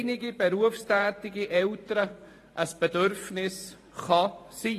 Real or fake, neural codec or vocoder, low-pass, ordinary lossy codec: fake; vocoder, 44.1 kHz, 128 mel bands every 512 samples, BigVGAN v2; 14.4 kHz; AAC, 48 kbps